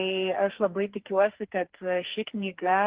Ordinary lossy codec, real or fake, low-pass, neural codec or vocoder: Opus, 16 kbps; fake; 3.6 kHz; codec, 44.1 kHz, 2.6 kbps, SNAC